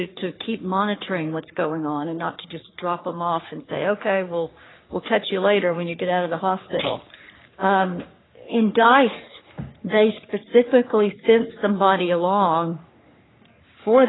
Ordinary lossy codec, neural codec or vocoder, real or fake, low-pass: AAC, 16 kbps; codec, 44.1 kHz, 3.4 kbps, Pupu-Codec; fake; 7.2 kHz